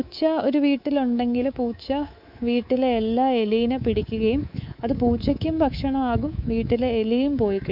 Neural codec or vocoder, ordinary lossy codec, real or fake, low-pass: codec, 24 kHz, 3.1 kbps, DualCodec; none; fake; 5.4 kHz